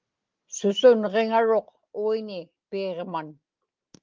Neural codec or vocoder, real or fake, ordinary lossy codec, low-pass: none; real; Opus, 32 kbps; 7.2 kHz